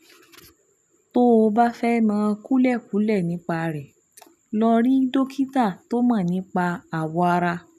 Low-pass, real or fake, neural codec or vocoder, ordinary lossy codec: 14.4 kHz; real; none; none